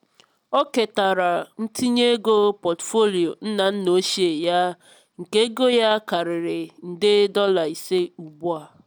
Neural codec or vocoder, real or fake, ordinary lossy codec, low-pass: none; real; none; none